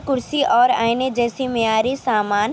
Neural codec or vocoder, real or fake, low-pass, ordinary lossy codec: none; real; none; none